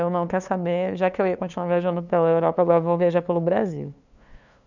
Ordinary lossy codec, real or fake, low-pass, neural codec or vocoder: none; fake; 7.2 kHz; codec, 16 kHz, 2 kbps, FunCodec, trained on LibriTTS, 25 frames a second